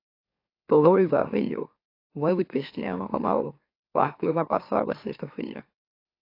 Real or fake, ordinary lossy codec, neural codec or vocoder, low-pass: fake; AAC, 32 kbps; autoencoder, 44.1 kHz, a latent of 192 numbers a frame, MeloTTS; 5.4 kHz